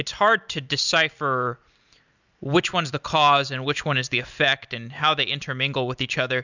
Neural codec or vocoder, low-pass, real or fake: none; 7.2 kHz; real